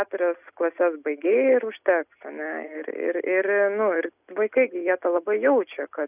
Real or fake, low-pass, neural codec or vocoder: real; 3.6 kHz; none